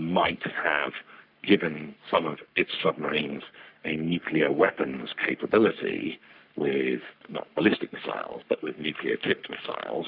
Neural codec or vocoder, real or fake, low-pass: codec, 44.1 kHz, 3.4 kbps, Pupu-Codec; fake; 5.4 kHz